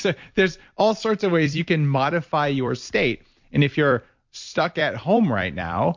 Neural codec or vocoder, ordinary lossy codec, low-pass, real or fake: vocoder, 44.1 kHz, 128 mel bands every 256 samples, BigVGAN v2; MP3, 48 kbps; 7.2 kHz; fake